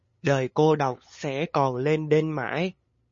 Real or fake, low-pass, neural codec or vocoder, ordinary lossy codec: fake; 7.2 kHz; codec, 16 kHz, 8 kbps, FunCodec, trained on LibriTTS, 25 frames a second; MP3, 32 kbps